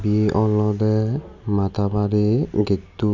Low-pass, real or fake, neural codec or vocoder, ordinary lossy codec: 7.2 kHz; real; none; none